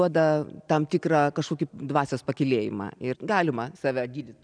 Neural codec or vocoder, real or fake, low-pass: none; real; 9.9 kHz